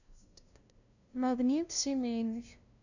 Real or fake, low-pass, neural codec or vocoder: fake; 7.2 kHz; codec, 16 kHz, 0.5 kbps, FunCodec, trained on LibriTTS, 25 frames a second